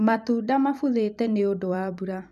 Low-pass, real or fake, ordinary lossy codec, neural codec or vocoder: 14.4 kHz; fake; none; vocoder, 44.1 kHz, 128 mel bands every 256 samples, BigVGAN v2